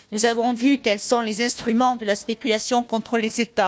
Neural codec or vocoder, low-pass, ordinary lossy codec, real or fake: codec, 16 kHz, 1 kbps, FunCodec, trained on Chinese and English, 50 frames a second; none; none; fake